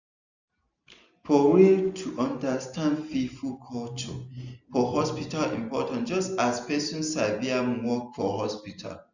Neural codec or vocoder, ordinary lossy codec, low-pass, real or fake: none; none; 7.2 kHz; real